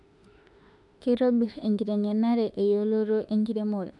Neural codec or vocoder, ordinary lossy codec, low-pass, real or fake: autoencoder, 48 kHz, 32 numbers a frame, DAC-VAE, trained on Japanese speech; none; 10.8 kHz; fake